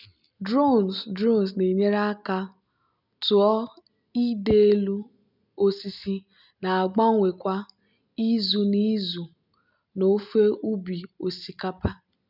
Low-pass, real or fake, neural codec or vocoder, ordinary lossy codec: 5.4 kHz; real; none; none